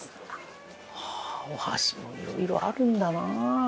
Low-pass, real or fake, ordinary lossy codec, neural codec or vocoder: none; real; none; none